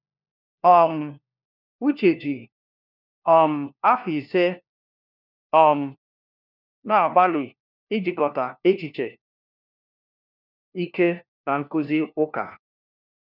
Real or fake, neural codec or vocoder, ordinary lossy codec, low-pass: fake; codec, 16 kHz, 1 kbps, FunCodec, trained on LibriTTS, 50 frames a second; none; 5.4 kHz